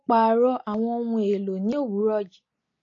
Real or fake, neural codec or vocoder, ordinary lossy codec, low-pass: real; none; AAC, 32 kbps; 7.2 kHz